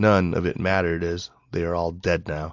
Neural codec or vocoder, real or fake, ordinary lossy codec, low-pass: none; real; AAC, 48 kbps; 7.2 kHz